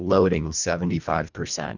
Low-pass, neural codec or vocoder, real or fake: 7.2 kHz; codec, 24 kHz, 1.5 kbps, HILCodec; fake